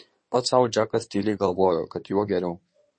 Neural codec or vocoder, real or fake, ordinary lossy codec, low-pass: codec, 16 kHz in and 24 kHz out, 2.2 kbps, FireRedTTS-2 codec; fake; MP3, 32 kbps; 9.9 kHz